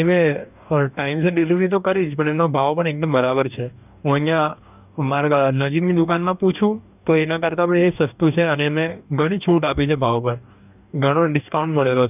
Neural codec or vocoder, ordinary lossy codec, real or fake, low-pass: codec, 44.1 kHz, 2.6 kbps, DAC; none; fake; 3.6 kHz